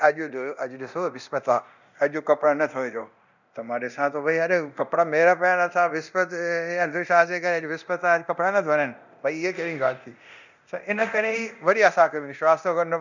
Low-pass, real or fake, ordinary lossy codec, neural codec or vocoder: 7.2 kHz; fake; none; codec, 24 kHz, 0.9 kbps, DualCodec